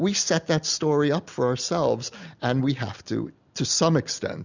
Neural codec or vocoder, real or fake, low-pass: none; real; 7.2 kHz